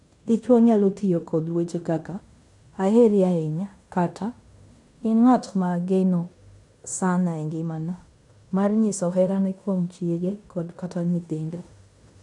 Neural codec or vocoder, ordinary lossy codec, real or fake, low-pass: codec, 16 kHz in and 24 kHz out, 0.9 kbps, LongCat-Audio-Codec, fine tuned four codebook decoder; none; fake; 10.8 kHz